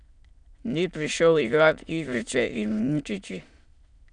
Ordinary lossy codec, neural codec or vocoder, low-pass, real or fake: none; autoencoder, 22.05 kHz, a latent of 192 numbers a frame, VITS, trained on many speakers; 9.9 kHz; fake